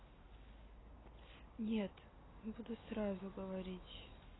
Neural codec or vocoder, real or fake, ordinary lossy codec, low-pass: none; real; AAC, 16 kbps; 7.2 kHz